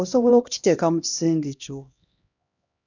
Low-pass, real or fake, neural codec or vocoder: 7.2 kHz; fake; codec, 16 kHz, 1 kbps, X-Codec, HuBERT features, trained on LibriSpeech